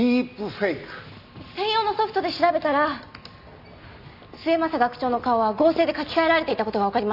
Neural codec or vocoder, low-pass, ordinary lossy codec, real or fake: none; 5.4 kHz; none; real